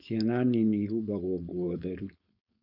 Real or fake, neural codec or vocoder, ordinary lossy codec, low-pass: fake; codec, 16 kHz, 4.8 kbps, FACodec; Opus, 64 kbps; 5.4 kHz